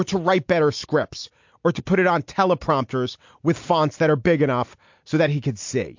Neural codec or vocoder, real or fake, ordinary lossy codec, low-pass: none; real; MP3, 48 kbps; 7.2 kHz